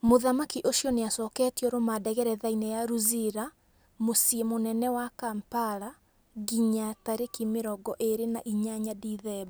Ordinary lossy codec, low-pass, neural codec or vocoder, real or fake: none; none; none; real